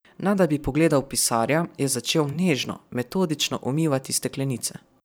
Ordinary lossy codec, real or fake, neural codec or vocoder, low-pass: none; fake; vocoder, 44.1 kHz, 128 mel bands every 512 samples, BigVGAN v2; none